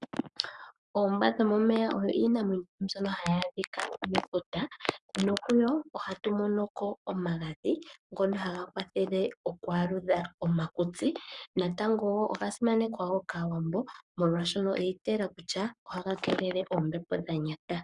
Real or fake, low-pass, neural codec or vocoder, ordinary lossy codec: fake; 10.8 kHz; codec, 44.1 kHz, 7.8 kbps, Pupu-Codec; Opus, 64 kbps